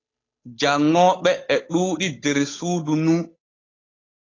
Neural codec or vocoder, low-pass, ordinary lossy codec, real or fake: codec, 16 kHz, 8 kbps, FunCodec, trained on Chinese and English, 25 frames a second; 7.2 kHz; AAC, 32 kbps; fake